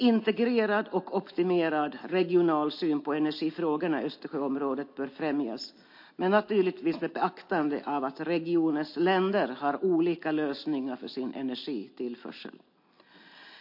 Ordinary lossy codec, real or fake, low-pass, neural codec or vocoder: MP3, 32 kbps; real; 5.4 kHz; none